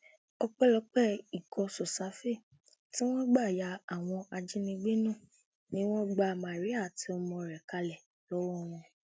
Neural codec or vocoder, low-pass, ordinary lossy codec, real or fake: none; none; none; real